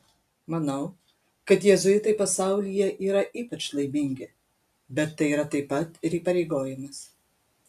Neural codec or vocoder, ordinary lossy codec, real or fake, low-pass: none; AAC, 96 kbps; real; 14.4 kHz